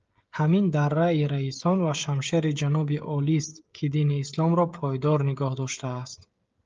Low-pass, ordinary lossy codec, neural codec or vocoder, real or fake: 7.2 kHz; Opus, 32 kbps; codec, 16 kHz, 16 kbps, FreqCodec, smaller model; fake